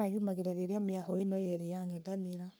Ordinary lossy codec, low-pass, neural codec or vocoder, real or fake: none; none; codec, 44.1 kHz, 3.4 kbps, Pupu-Codec; fake